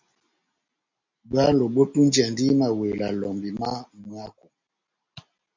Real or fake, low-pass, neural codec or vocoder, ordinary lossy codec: real; 7.2 kHz; none; MP3, 48 kbps